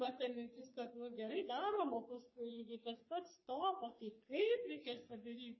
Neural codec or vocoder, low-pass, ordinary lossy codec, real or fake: codec, 44.1 kHz, 3.4 kbps, Pupu-Codec; 7.2 kHz; MP3, 24 kbps; fake